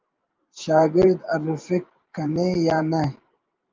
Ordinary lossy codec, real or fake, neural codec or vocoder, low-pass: Opus, 24 kbps; fake; vocoder, 44.1 kHz, 128 mel bands every 512 samples, BigVGAN v2; 7.2 kHz